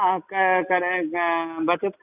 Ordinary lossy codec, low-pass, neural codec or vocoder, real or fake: none; 3.6 kHz; none; real